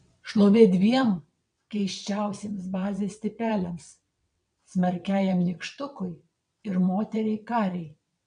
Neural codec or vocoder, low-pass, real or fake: vocoder, 22.05 kHz, 80 mel bands, WaveNeXt; 9.9 kHz; fake